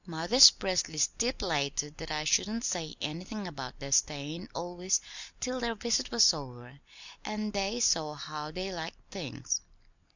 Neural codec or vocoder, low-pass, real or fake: none; 7.2 kHz; real